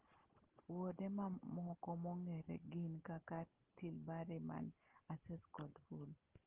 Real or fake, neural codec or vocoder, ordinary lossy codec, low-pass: real; none; Opus, 16 kbps; 3.6 kHz